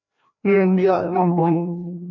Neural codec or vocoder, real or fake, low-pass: codec, 16 kHz, 1 kbps, FreqCodec, larger model; fake; 7.2 kHz